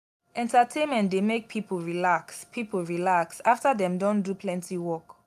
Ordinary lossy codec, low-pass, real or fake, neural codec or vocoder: AAC, 96 kbps; 14.4 kHz; real; none